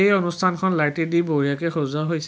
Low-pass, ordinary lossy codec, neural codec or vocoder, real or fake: none; none; none; real